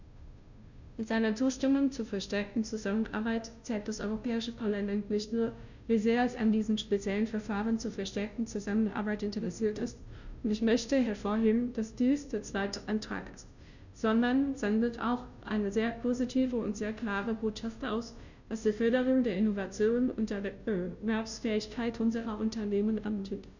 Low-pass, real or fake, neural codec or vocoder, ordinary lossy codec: 7.2 kHz; fake; codec, 16 kHz, 0.5 kbps, FunCodec, trained on Chinese and English, 25 frames a second; none